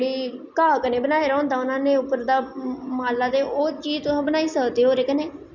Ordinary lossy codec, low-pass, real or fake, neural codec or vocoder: none; 7.2 kHz; real; none